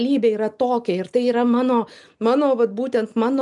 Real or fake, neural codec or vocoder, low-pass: real; none; 10.8 kHz